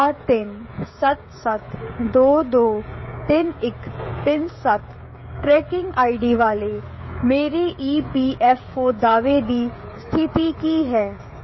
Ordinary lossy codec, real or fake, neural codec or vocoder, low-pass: MP3, 24 kbps; fake; codec, 16 kHz, 16 kbps, FreqCodec, smaller model; 7.2 kHz